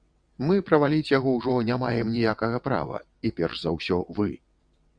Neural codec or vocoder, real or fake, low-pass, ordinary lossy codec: vocoder, 22.05 kHz, 80 mel bands, WaveNeXt; fake; 9.9 kHz; Opus, 64 kbps